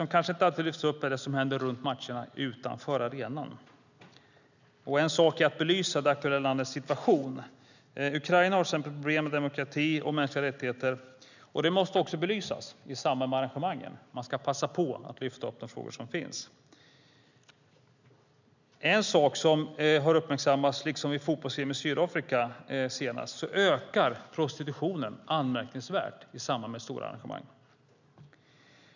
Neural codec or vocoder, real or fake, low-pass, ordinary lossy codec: none; real; 7.2 kHz; none